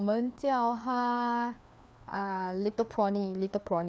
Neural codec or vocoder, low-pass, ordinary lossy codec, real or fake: codec, 16 kHz, 2 kbps, FreqCodec, larger model; none; none; fake